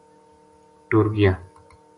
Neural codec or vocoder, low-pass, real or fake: none; 10.8 kHz; real